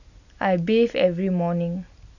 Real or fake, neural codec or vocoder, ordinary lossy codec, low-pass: real; none; none; 7.2 kHz